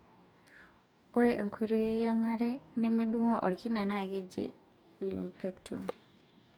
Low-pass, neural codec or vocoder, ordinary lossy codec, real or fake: 19.8 kHz; codec, 44.1 kHz, 2.6 kbps, DAC; none; fake